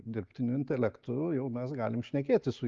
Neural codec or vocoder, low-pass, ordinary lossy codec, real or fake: none; 7.2 kHz; Opus, 32 kbps; real